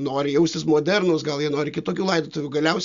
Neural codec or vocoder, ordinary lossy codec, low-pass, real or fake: none; Opus, 64 kbps; 7.2 kHz; real